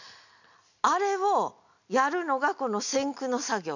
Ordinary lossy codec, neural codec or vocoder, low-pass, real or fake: none; none; 7.2 kHz; real